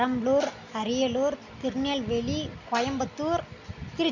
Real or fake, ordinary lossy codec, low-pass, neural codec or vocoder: real; none; 7.2 kHz; none